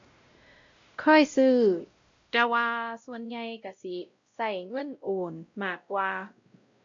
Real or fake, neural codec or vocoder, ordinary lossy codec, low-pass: fake; codec, 16 kHz, 0.5 kbps, X-Codec, WavLM features, trained on Multilingual LibriSpeech; MP3, 48 kbps; 7.2 kHz